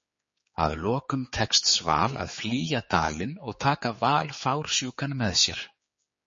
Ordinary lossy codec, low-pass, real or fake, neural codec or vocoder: MP3, 32 kbps; 7.2 kHz; fake; codec, 16 kHz, 4 kbps, X-Codec, HuBERT features, trained on general audio